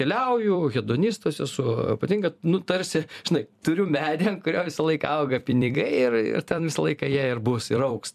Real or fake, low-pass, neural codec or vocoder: fake; 14.4 kHz; vocoder, 44.1 kHz, 128 mel bands every 256 samples, BigVGAN v2